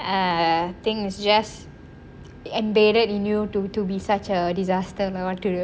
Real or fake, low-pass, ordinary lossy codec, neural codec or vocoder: real; none; none; none